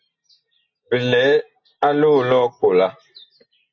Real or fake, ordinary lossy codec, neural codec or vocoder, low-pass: real; AAC, 48 kbps; none; 7.2 kHz